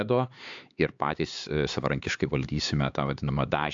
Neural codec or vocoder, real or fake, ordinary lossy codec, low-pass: codec, 16 kHz, 4 kbps, X-Codec, HuBERT features, trained on LibriSpeech; fake; AAC, 64 kbps; 7.2 kHz